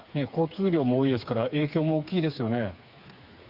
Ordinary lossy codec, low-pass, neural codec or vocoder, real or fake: Opus, 64 kbps; 5.4 kHz; codec, 16 kHz, 4 kbps, FreqCodec, smaller model; fake